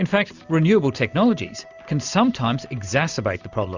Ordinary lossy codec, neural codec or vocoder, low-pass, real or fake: Opus, 64 kbps; none; 7.2 kHz; real